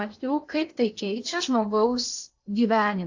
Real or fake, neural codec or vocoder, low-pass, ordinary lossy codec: fake; codec, 16 kHz in and 24 kHz out, 0.8 kbps, FocalCodec, streaming, 65536 codes; 7.2 kHz; AAC, 48 kbps